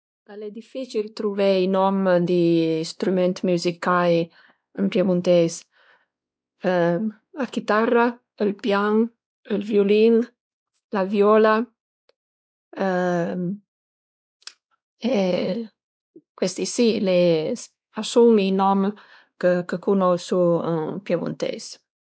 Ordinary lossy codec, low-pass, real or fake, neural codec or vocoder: none; none; fake; codec, 16 kHz, 2 kbps, X-Codec, WavLM features, trained on Multilingual LibriSpeech